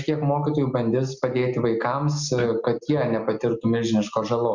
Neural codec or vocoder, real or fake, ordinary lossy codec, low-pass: none; real; Opus, 64 kbps; 7.2 kHz